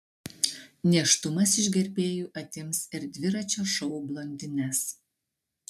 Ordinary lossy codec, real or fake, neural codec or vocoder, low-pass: MP3, 96 kbps; real; none; 14.4 kHz